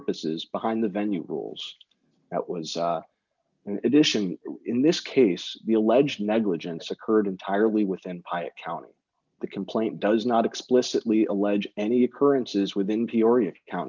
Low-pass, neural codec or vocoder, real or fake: 7.2 kHz; none; real